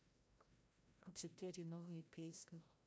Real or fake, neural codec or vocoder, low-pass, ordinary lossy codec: fake; codec, 16 kHz, 0.5 kbps, FreqCodec, larger model; none; none